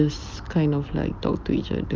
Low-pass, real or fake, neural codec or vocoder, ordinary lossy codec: 7.2 kHz; real; none; Opus, 32 kbps